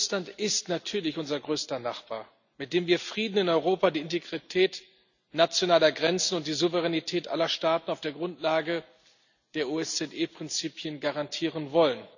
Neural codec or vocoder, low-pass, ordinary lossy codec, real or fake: none; 7.2 kHz; none; real